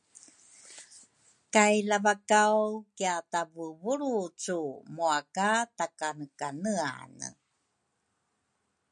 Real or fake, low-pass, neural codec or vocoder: real; 9.9 kHz; none